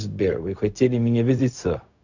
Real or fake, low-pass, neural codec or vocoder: fake; 7.2 kHz; codec, 16 kHz, 0.4 kbps, LongCat-Audio-Codec